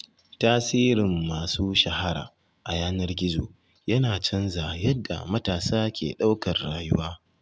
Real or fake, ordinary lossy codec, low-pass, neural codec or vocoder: real; none; none; none